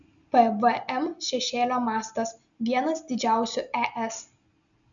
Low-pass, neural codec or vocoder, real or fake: 7.2 kHz; none; real